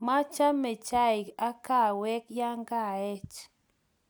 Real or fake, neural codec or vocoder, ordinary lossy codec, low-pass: real; none; none; none